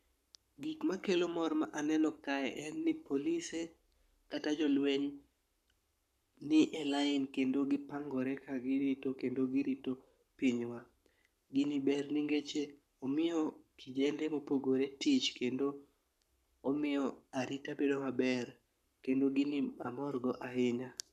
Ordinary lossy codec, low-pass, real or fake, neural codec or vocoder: none; 14.4 kHz; fake; codec, 44.1 kHz, 7.8 kbps, Pupu-Codec